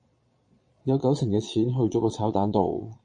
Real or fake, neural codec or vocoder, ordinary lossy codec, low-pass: fake; vocoder, 22.05 kHz, 80 mel bands, Vocos; MP3, 48 kbps; 9.9 kHz